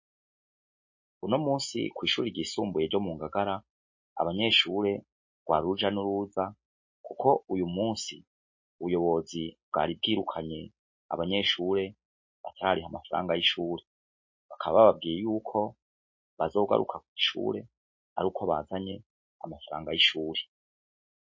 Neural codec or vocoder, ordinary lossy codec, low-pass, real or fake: none; MP3, 32 kbps; 7.2 kHz; real